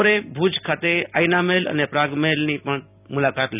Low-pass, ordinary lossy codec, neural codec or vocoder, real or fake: 3.6 kHz; none; none; real